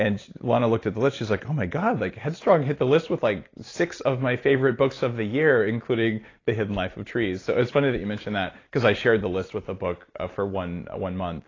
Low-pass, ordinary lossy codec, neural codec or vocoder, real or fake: 7.2 kHz; AAC, 32 kbps; none; real